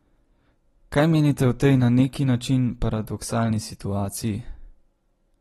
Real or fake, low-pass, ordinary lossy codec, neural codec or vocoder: real; 19.8 kHz; AAC, 32 kbps; none